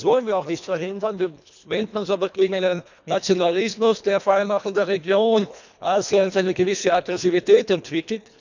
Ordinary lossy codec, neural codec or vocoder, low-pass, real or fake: none; codec, 24 kHz, 1.5 kbps, HILCodec; 7.2 kHz; fake